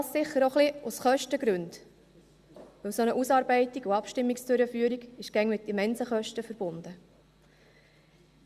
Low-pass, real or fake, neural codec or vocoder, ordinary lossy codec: 14.4 kHz; real; none; Opus, 64 kbps